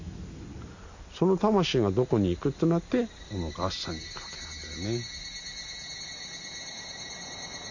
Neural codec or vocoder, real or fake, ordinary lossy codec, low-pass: none; real; AAC, 48 kbps; 7.2 kHz